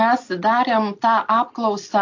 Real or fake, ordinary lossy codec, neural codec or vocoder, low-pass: real; AAC, 48 kbps; none; 7.2 kHz